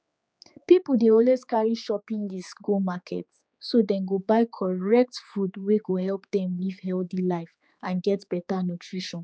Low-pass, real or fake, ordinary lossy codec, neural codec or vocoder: none; fake; none; codec, 16 kHz, 4 kbps, X-Codec, HuBERT features, trained on general audio